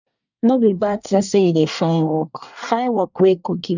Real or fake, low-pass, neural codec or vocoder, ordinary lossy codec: fake; 7.2 kHz; codec, 24 kHz, 1 kbps, SNAC; none